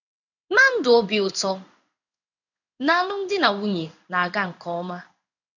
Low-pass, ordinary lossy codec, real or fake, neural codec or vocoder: 7.2 kHz; none; fake; codec, 16 kHz in and 24 kHz out, 1 kbps, XY-Tokenizer